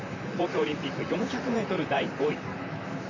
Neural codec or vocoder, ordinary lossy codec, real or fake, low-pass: vocoder, 44.1 kHz, 128 mel bands, Pupu-Vocoder; none; fake; 7.2 kHz